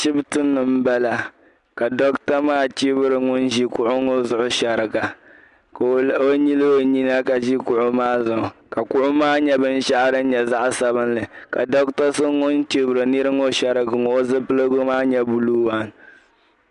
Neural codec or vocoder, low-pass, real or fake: none; 10.8 kHz; real